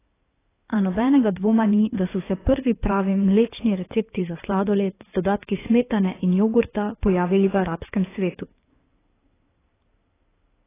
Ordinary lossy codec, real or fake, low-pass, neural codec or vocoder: AAC, 16 kbps; fake; 3.6 kHz; codec, 16 kHz in and 24 kHz out, 2.2 kbps, FireRedTTS-2 codec